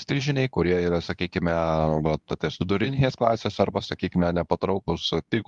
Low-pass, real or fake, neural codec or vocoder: 10.8 kHz; fake; codec, 24 kHz, 0.9 kbps, WavTokenizer, medium speech release version 2